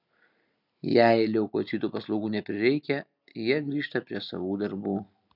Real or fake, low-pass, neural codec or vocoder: real; 5.4 kHz; none